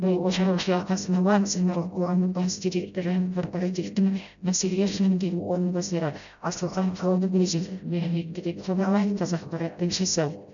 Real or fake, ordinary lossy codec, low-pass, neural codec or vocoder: fake; none; 7.2 kHz; codec, 16 kHz, 0.5 kbps, FreqCodec, smaller model